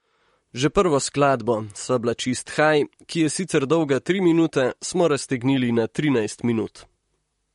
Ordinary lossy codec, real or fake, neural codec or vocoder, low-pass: MP3, 48 kbps; fake; vocoder, 44.1 kHz, 128 mel bands, Pupu-Vocoder; 19.8 kHz